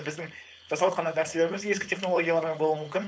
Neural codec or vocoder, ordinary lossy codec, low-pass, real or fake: codec, 16 kHz, 4.8 kbps, FACodec; none; none; fake